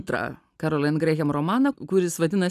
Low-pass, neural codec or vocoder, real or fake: 14.4 kHz; none; real